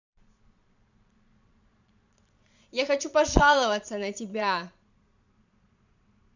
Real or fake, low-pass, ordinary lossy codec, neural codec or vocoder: fake; 7.2 kHz; none; vocoder, 22.05 kHz, 80 mel bands, Vocos